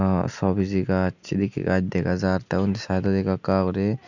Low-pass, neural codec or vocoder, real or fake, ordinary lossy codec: 7.2 kHz; none; real; none